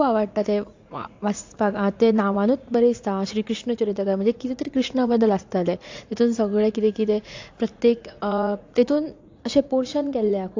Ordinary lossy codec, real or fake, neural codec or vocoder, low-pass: AAC, 48 kbps; fake; vocoder, 44.1 kHz, 80 mel bands, Vocos; 7.2 kHz